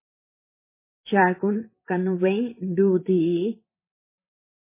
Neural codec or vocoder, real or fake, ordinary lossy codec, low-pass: vocoder, 44.1 kHz, 128 mel bands, Pupu-Vocoder; fake; MP3, 16 kbps; 3.6 kHz